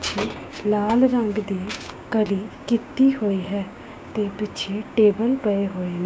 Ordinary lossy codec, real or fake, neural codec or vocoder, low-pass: none; fake; codec, 16 kHz, 6 kbps, DAC; none